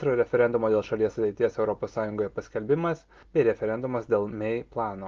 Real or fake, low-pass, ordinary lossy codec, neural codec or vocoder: real; 7.2 kHz; Opus, 32 kbps; none